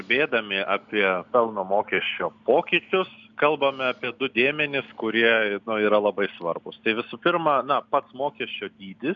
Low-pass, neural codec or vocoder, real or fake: 7.2 kHz; none; real